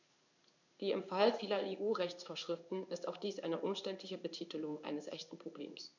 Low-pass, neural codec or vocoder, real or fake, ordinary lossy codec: 7.2 kHz; codec, 16 kHz in and 24 kHz out, 1 kbps, XY-Tokenizer; fake; none